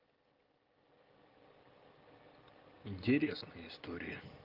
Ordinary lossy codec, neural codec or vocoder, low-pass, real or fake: Opus, 32 kbps; none; 5.4 kHz; real